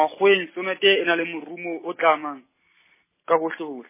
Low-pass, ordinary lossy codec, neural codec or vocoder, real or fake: 3.6 kHz; MP3, 16 kbps; none; real